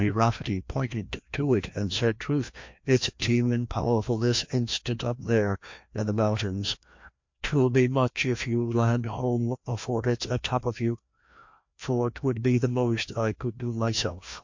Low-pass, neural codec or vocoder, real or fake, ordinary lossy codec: 7.2 kHz; codec, 16 kHz, 1 kbps, FreqCodec, larger model; fake; MP3, 48 kbps